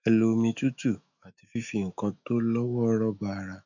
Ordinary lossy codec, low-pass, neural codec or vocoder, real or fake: none; 7.2 kHz; autoencoder, 48 kHz, 128 numbers a frame, DAC-VAE, trained on Japanese speech; fake